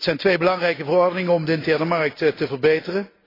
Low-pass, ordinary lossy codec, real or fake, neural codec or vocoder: 5.4 kHz; AAC, 24 kbps; real; none